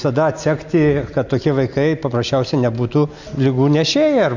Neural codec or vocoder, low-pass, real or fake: none; 7.2 kHz; real